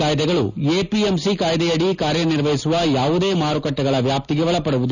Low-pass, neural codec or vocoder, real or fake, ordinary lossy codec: 7.2 kHz; none; real; none